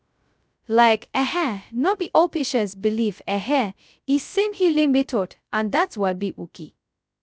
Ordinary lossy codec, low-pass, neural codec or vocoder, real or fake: none; none; codec, 16 kHz, 0.2 kbps, FocalCodec; fake